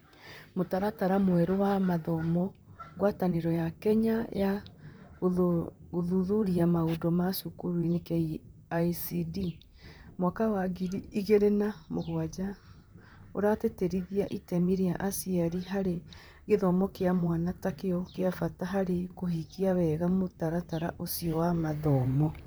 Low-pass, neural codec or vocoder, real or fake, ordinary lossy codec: none; vocoder, 44.1 kHz, 128 mel bands, Pupu-Vocoder; fake; none